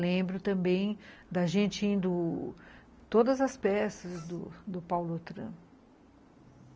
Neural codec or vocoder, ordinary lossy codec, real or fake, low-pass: none; none; real; none